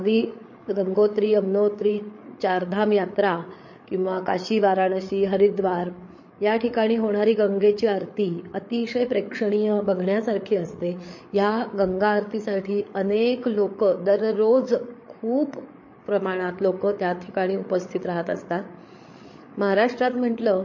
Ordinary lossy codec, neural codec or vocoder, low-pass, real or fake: MP3, 32 kbps; codec, 16 kHz, 8 kbps, FreqCodec, larger model; 7.2 kHz; fake